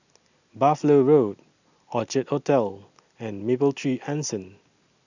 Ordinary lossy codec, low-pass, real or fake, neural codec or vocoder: none; 7.2 kHz; real; none